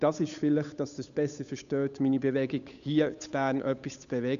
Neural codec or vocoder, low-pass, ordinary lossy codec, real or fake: codec, 16 kHz, 8 kbps, FunCodec, trained on Chinese and English, 25 frames a second; 7.2 kHz; none; fake